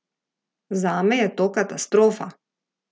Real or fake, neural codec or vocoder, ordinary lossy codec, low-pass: real; none; none; none